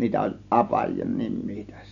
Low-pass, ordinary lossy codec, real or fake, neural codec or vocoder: 7.2 kHz; none; real; none